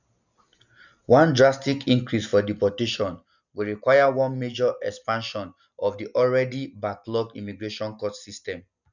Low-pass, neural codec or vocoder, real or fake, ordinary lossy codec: 7.2 kHz; none; real; none